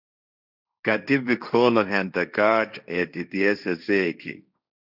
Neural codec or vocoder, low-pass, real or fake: codec, 16 kHz, 1.1 kbps, Voila-Tokenizer; 5.4 kHz; fake